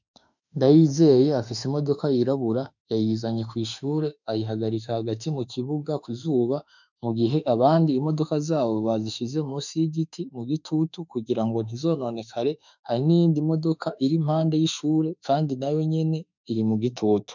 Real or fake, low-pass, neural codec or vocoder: fake; 7.2 kHz; autoencoder, 48 kHz, 32 numbers a frame, DAC-VAE, trained on Japanese speech